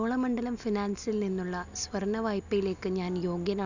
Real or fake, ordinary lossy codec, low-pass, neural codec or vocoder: real; none; 7.2 kHz; none